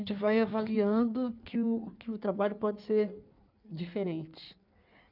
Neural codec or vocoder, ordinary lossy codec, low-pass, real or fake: codec, 16 kHz in and 24 kHz out, 1.1 kbps, FireRedTTS-2 codec; none; 5.4 kHz; fake